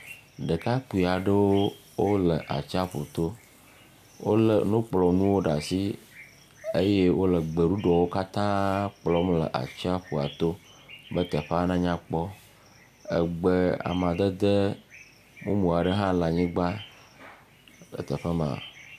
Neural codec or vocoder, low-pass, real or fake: none; 14.4 kHz; real